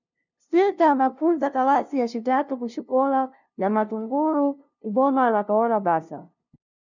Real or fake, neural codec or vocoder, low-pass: fake; codec, 16 kHz, 0.5 kbps, FunCodec, trained on LibriTTS, 25 frames a second; 7.2 kHz